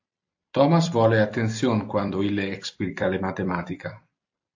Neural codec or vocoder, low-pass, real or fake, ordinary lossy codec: vocoder, 44.1 kHz, 128 mel bands every 256 samples, BigVGAN v2; 7.2 kHz; fake; AAC, 48 kbps